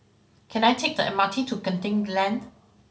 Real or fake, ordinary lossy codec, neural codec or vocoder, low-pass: real; none; none; none